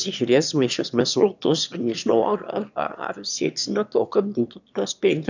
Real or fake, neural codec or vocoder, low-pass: fake; autoencoder, 22.05 kHz, a latent of 192 numbers a frame, VITS, trained on one speaker; 7.2 kHz